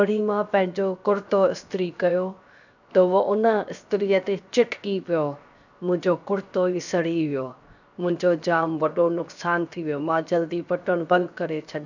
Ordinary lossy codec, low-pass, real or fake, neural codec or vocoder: none; 7.2 kHz; fake; codec, 16 kHz, 0.7 kbps, FocalCodec